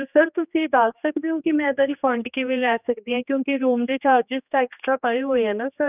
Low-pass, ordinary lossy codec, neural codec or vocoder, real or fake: 3.6 kHz; none; codec, 16 kHz, 2 kbps, X-Codec, HuBERT features, trained on general audio; fake